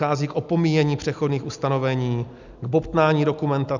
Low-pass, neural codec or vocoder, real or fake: 7.2 kHz; none; real